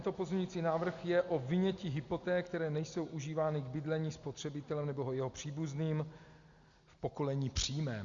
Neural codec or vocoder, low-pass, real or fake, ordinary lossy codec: none; 7.2 kHz; real; Opus, 64 kbps